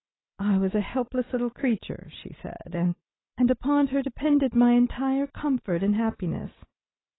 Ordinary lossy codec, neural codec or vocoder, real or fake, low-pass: AAC, 16 kbps; none; real; 7.2 kHz